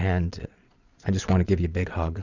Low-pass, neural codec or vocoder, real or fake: 7.2 kHz; vocoder, 22.05 kHz, 80 mel bands, Vocos; fake